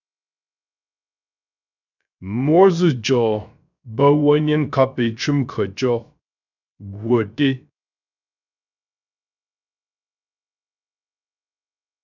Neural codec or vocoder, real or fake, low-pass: codec, 16 kHz, 0.3 kbps, FocalCodec; fake; 7.2 kHz